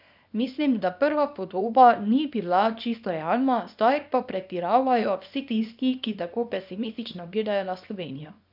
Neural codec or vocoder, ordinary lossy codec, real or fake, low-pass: codec, 24 kHz, 0.9 kbps, WavTokenizer, small release; none; fake; 5.4 kHz